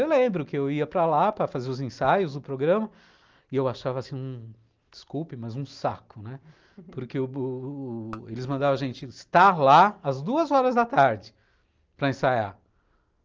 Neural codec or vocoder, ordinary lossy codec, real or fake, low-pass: none; Opus, 24 kbps; real; 7.2 kHz